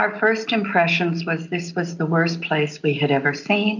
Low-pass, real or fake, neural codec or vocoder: 7.2 kHz; real; none